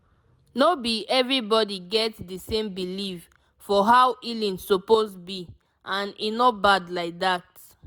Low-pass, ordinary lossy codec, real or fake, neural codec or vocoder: none; none; real; none